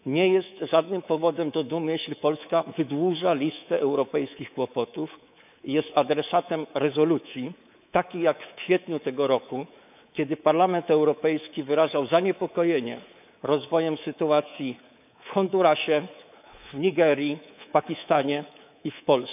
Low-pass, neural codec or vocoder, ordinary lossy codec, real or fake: 3.6 kHz; codec, 24 kHz, 3.1 kbps, DualCodec; none; fake